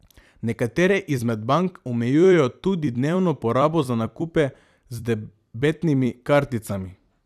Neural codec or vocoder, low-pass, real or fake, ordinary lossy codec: vocoder, 44.1 kHz, 128 mel bands every 256 samples, BigVGAN v2; 14.4 kHz; fake; none